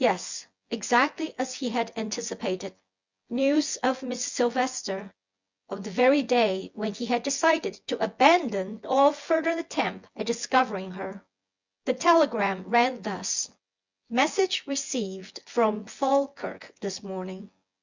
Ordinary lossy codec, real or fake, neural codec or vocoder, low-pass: Opus, 64 kbps; fake; vocoder, 24 kHz, 100 mel bands, Vocos; 7.2 kHz